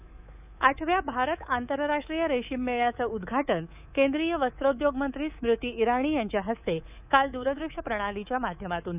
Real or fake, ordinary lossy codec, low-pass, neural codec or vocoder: fake; none; 3.6 kHz; codec, 24 kHz, 3.1 kbps, DualCodec